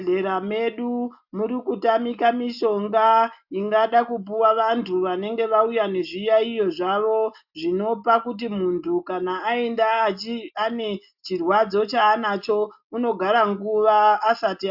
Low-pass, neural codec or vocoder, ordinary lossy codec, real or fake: 5.4 kHz; none; AAC, 48 kbps; real